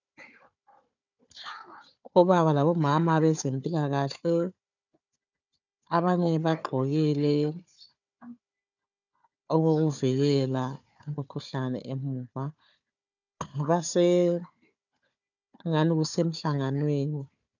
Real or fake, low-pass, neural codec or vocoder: fake; 7.2 kHz; codec, 16 kHz, 4 kbps, FunCodec, trained on Chinese and English, 50 frames a second